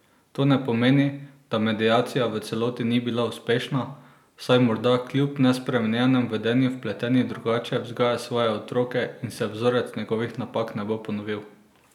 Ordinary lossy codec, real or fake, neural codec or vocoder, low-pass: none; real; none; 19.8 kHz